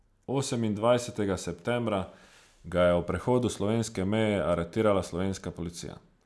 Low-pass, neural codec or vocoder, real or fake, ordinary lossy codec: none; none; real; none